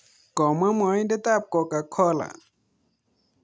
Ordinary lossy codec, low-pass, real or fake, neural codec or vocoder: none; none; real; none